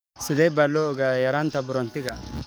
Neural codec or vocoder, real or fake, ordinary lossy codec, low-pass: codec, 44.1 kHz, 7.8 kbps, DAC; fake; none; none